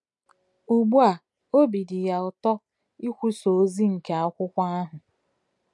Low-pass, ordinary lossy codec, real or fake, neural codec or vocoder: 10.8 kHz; MP3, 96 kbps; real; none